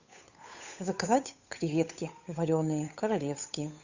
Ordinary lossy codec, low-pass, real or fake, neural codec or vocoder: Opus, 64 kbps; 7.2 kHz; fake; codec, 16 kHz, 8 kbps, FunCodec, trained on Chinese and English, 25 frames a second